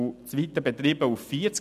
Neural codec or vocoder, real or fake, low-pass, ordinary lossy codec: none; real; 14.4 kHz; none